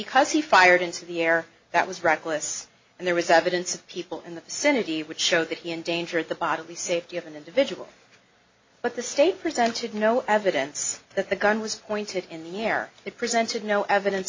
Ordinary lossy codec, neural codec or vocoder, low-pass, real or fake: MP3, 32 kbps; none; 7.2 kHz; real